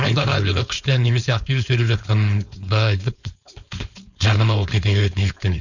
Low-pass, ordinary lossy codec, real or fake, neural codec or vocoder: 7.2 kHz; none; fake; codec, 16 kHz, 4.8 kbps, FACodec